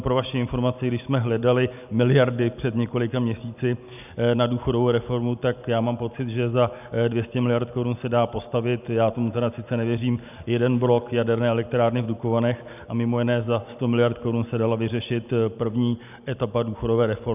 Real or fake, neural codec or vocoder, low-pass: real; none; 3.6 kHz